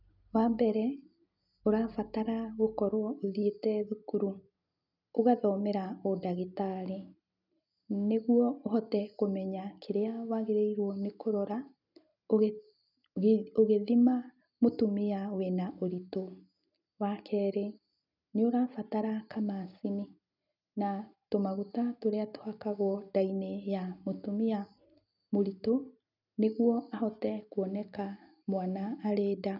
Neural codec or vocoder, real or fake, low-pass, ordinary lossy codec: none; real; 5.4 kHz; none